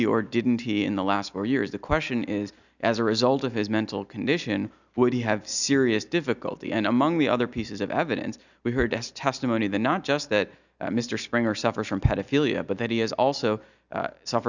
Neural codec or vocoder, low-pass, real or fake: none; 7.2 kHz; real